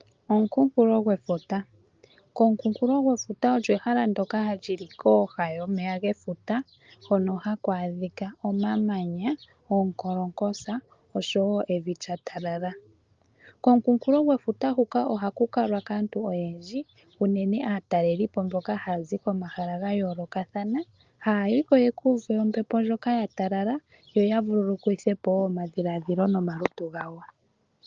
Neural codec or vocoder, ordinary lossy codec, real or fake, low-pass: none; Opus, 24 kbps; real; 7.2 kHz